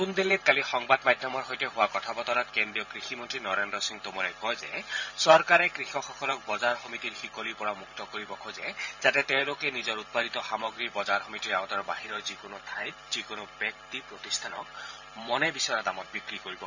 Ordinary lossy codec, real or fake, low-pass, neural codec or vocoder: none; fake; 7.2 kHz; vocoder, 44.1 kHz, 128 mel bands every 512 samples, BigVGAN v2